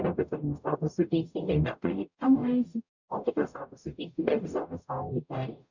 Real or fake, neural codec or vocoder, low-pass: fake; codec, 44.1 kHz, 0.9 kbps, DAC; 7.2 kHz